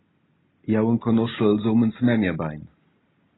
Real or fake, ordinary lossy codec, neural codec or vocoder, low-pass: real; AAC, 16 kbps; none; 7.2 kHz